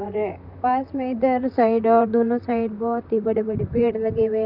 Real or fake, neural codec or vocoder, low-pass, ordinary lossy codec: fake; vocoder, 44.1 kHz, 128 mel bands, Pupu-Vocoder; 5.4 kHz; none